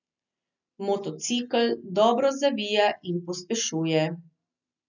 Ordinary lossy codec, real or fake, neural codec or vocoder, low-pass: none; real; none; 7.2 kHz